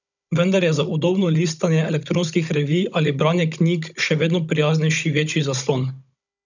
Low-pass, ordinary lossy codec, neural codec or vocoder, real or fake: 7.2 kHz; none; codec, 16 kHz, 16 kbps, FunCodec, trained on Chinese and English, 50 frames a second; fake